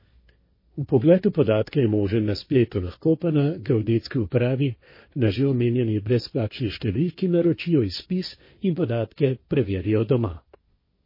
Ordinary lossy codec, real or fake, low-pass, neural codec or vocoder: MP3, 24 kbps; fake; 5.4 kHz; codec, 16 kHz, 1.1 kbps, Voila-Tokenizer